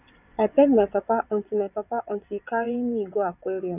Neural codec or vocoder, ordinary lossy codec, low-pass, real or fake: vocoder, 22.05 kHz, 80 mel bands, WaveNeXt; none; 3.6 kHz; fake